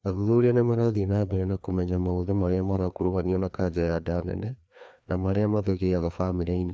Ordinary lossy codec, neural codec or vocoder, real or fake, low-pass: none; codec, 16 kHz, 2 kbps, FreqCodec, larger model; fake; none